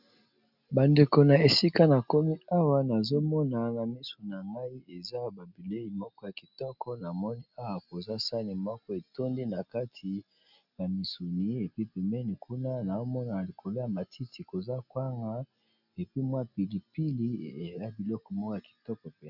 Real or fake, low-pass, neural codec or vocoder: real; 5.4 kHz; none